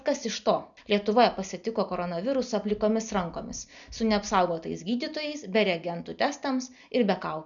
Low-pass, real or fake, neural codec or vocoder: 7.2 kHz; real; none